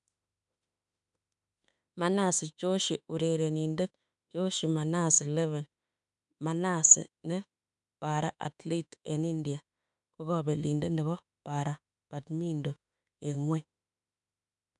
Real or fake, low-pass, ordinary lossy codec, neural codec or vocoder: fake; 10.8 kHz; none; autoencoder, 48 kHz, 32 numbers a frame, DAC-VAE, trained on Japanese speech